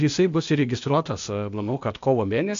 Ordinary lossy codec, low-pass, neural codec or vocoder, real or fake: AAC, 64 kbps; 7.2 kHz; codec, 16 kHz, 0.8 kbps, ZipCodec; fake